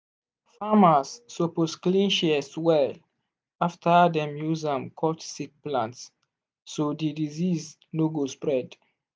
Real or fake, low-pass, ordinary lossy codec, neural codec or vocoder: real; none; none; none